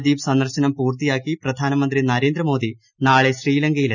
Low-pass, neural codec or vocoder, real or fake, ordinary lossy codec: 7.2 kHz; none; real; none